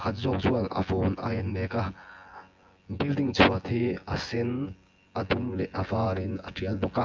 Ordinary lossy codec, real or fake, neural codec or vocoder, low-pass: Opus, 24 kbps; fake; vocoder, 24 kHz, 100 mel bands, Vocos; 7.2 kHz